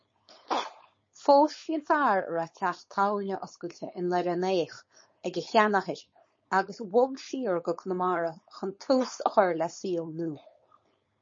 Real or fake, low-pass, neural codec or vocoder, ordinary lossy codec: fake; 7.2 kHz; codec, 16 kHz, 4.8 kbps, FACodec; MP3, 32 kbps